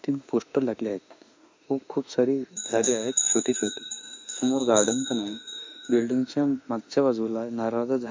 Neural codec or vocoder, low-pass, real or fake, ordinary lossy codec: autoencoder, 48 kHz, 32 numbers a frame, DAC-VAE, trained on Japanese speech; 7.2 kHz; fake; MP3, 64 kbps